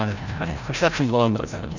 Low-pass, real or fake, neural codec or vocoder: 7.2 kHz; fake; codec, 16 kHz, 0.5 kbps, FreqCodec, larger model